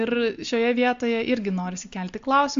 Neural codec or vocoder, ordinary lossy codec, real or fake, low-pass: none; AAC, 64 kbps; real; 7.2 kHz